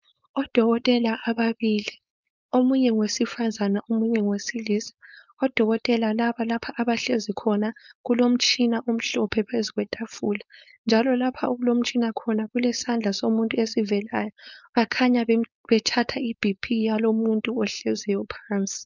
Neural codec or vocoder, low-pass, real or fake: codec, 16 kHz, 4.8 kbps, FACodec; 7.2 kHz; fake